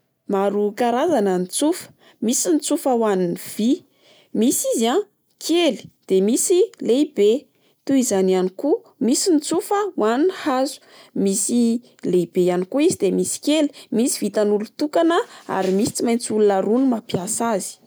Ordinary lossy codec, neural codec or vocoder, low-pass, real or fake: none; none; none; real